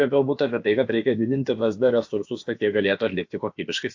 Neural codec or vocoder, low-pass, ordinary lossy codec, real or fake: codec, 16 kHz, about 1 kbps, DyCAST, with the encoder's durations; 7.2 kHz; AAC, 48 kbps; fake